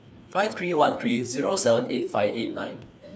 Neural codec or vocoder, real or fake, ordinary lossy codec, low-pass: codec, 16 kHz, 2 kbps, FreqCodec, larger model; fake; none; none